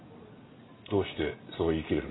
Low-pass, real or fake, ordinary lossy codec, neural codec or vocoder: 7.2 kHz; real; AAC, 16 kbps; none